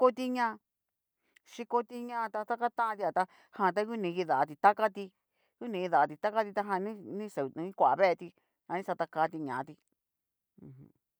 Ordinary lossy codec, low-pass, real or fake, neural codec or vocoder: none; none; real; none